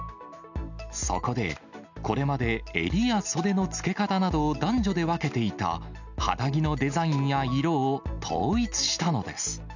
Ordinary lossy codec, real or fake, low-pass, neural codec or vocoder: none; real; 7.2 kHz; none